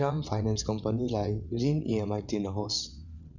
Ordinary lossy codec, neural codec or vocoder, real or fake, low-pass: none; vocoder, 22.05 kHz, 80 mel bands, WaveNeXt; fake; 7.2 kHz